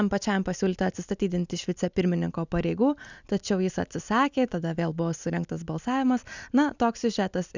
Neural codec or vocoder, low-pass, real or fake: none; 7.2 kHz; real